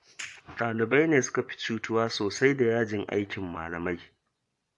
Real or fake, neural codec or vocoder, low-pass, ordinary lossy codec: fake; vocoder, 24 kHz, 100 mel bands, Vocos; 10.8 kHz; AAC, 64 kbps